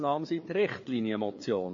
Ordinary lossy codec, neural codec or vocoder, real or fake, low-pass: MP3, 32 kbps; codec, 16 kHz, 4 kbps, FreqCodec, larger model; fake; 7.2 kHz